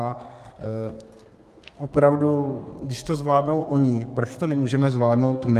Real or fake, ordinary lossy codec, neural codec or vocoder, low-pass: fake; Opus, 32 kbps; codec, 32 kHz, 1.9 kbps, SNAC; 14.4 kHz